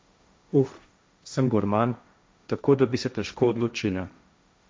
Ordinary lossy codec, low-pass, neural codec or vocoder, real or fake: none; none; codec, 16 kHz, 1.1 kbps, Voila-Tokenizer; fake